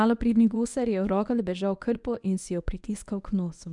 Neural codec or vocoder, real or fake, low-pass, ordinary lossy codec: codec, 24 kHz, 0.9 kbps, WavTokenizer, medium speech release version 1; fake; 10.8 kHz; none